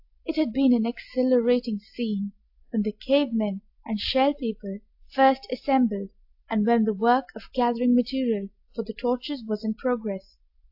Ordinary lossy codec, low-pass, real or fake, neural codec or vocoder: AAC, 48 kbps; 5.4 kHz; real; none